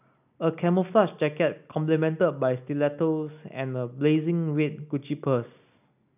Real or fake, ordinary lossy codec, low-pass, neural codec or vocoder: real; none; 3.6 kHz; none